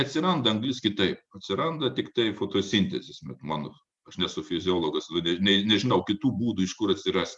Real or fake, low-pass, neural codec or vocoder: real; 10.8 kHz; none